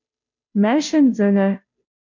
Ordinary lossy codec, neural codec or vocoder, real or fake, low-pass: MP3, 64 kbps; codec, 16 kHz, 0.5 kbps, FunCodec, trained on Chinese and English, 25 frames a second; fake; 7.2 kHz